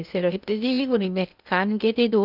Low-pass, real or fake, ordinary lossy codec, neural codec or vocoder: 5.4 kHz; fake; none; codec, 16 kHz in and 24 kHz out, 0.8 kbps, FocalCodec, streaming, 65536 codes